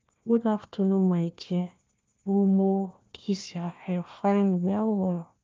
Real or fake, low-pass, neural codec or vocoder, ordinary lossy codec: fake; 7.2 kHz; codec, 16 kHz, 1 kbps, FunCodec, trained on Chinese and English, 50 frames a second; Opus, 32 kbps